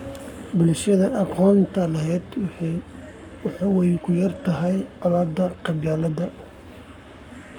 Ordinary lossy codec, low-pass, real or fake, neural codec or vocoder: none; 19.8 kHz; fake; codec, 44.1 kHz, 7.8 kbps, Pupu-Codec